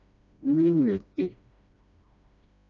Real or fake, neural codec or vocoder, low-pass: fake; codec, 16 kHz, 1 kbps, FreqCodec, smaller model; 7.2 kHz